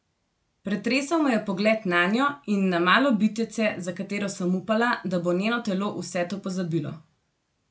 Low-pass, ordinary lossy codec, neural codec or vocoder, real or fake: none; none; none; real